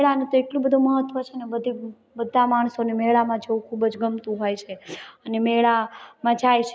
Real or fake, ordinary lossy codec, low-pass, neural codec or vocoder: real; none; none; none